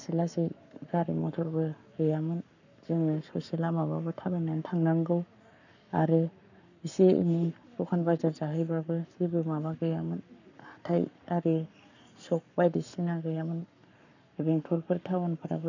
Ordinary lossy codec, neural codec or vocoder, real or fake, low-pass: none; codec, 44.1 kHz, 7.8 kbps, Pupu-Codec; fake; 7.2 kHz